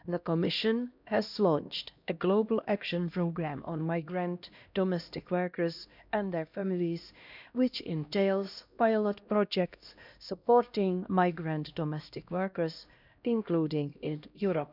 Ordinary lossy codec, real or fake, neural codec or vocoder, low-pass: none; fake; codec, 16 kHz, 1 kbps, X-Codec, HuBERT features, trained on LibriSpeech; 5.4 kHz